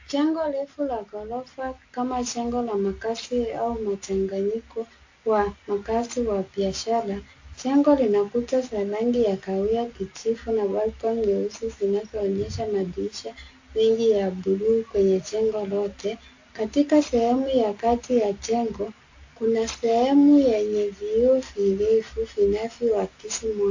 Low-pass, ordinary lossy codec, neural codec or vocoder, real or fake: 7.2 kHz; AAC, 48 kbps; none; real